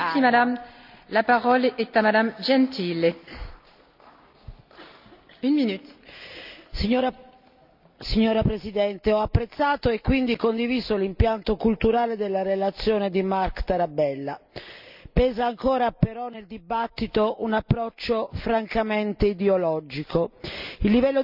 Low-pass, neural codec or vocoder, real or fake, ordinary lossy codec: 5.4 kHz; none; real; none